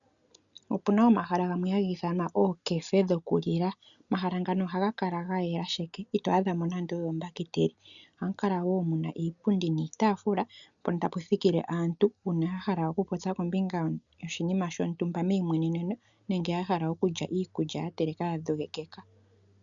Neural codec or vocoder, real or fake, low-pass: none; real; 7.2 kHz